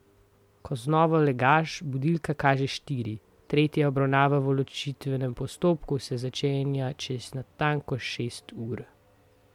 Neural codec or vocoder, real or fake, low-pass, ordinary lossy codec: none; real; 19.8 kHz; MP3, 96 kbps